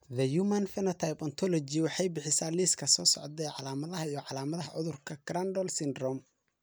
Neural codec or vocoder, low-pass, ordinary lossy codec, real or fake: none; none; none; real